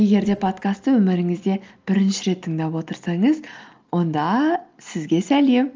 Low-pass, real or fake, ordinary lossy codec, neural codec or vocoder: 7.2 kHz; real; Opus, 24 kbps; none